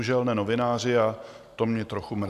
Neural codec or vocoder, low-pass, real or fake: none; 14.4 kHz; real